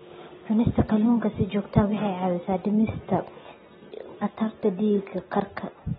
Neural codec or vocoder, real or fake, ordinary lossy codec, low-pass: none; real; AAC, 16 kbps; 7.2 kHz